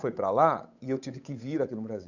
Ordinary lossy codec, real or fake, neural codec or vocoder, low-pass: none; fake; codec, 16 kHz, 8 kbps, FunCodec, trained on Chinese and English, 25 frames a second; 7.2 kHz